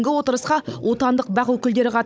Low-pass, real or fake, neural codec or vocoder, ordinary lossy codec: none; fake; codec, 16 kHz, 16 kbps, FunCodec, trained on Chinese and English, 50 frames a second; none